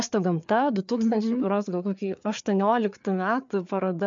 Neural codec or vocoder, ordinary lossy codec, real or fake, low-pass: codec, 16 kHz, 4 kbps, FreqCodec, larger model; MP3, 64 kbps; fake; 7.2 kHz